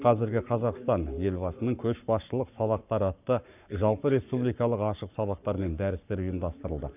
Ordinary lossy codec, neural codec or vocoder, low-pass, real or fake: none; codec, 44.1 kHz, 7.8 kbps, Pupu-Codec; 3.6 kHz; fake